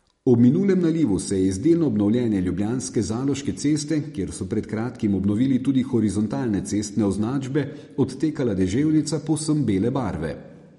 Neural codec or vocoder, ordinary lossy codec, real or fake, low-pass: none; MP3, 48 kbps; real; 19.8 kHz